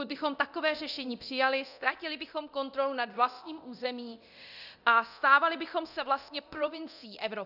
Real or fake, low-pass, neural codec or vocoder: fake; 5.4 kHz; codec, 24 kHz, 0.9 kbps, DualCodec